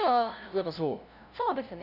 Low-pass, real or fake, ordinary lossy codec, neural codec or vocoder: 5.4 kHz; fake; none; codec, 16 kHz, 0.5 kbps, FunCodec, trained on LibriTTS, 25 frames a second